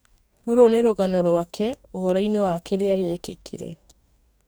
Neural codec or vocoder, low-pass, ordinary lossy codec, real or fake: codec, 44.1 kHz, 2.6 kbps, DAC; none; none; fake